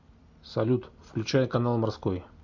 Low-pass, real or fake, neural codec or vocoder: 7.2 kHz; real; none